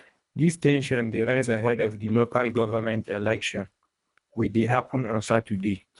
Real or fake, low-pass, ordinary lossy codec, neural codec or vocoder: fake; 10.8 kHz; none; codec, 24 kHz, 1.5 kbps, HILCodec